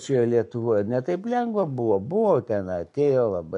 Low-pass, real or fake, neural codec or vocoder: 10.8 kHz; fake; codec, 44.1 kHz, 7.8 kbps, Pupu-Codec